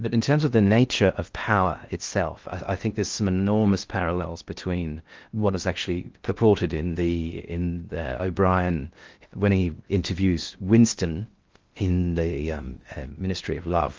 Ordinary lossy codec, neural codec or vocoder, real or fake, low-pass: Opus, 32 kbps; codec, 16 kHz in and 24 kHz out, 0.6 kbps, FocalCodec, streaming, 2048 codes; fake; 7.2 kHz